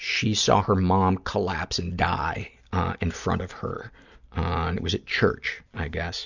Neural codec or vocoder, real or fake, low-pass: none; real; 7.2 kHz